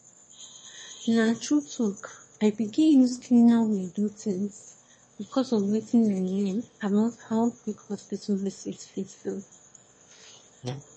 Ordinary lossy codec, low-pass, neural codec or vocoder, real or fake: MP3, 32 kbps; 9.9 kHz; autoencoder, 22.05 kHz, a latent of 192 numbers a frame, VITS, trained on one speaker; fake